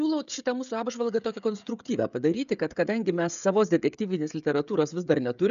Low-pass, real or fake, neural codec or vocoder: 7.2 kHz; fake; codec, 16 kHz, 16 kbps, FreqCodec, smaller model